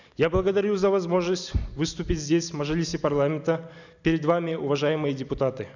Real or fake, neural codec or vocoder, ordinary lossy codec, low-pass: real; none; none; 7.2 kHz